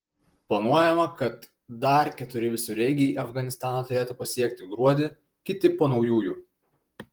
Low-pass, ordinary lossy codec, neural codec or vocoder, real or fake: 19.8 kHz; Opus, 24 kbps; vocoder, 44.1 kHz, 128 mel bands, Pupu-Vocoder; fake